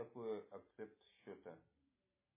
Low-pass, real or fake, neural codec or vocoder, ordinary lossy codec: 3.6 kHz; real; none; MP3, 16 kbps